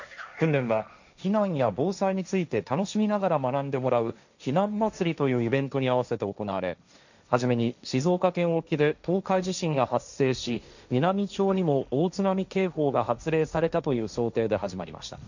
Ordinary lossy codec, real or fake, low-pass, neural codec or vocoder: none; fake; 7.2 kHz; codec, 16 kHz, 1.1 kbps, Voila-Tokenizer